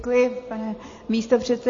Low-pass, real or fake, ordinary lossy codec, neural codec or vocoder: 7.2 kHz; real; MP3, 32 kbps; none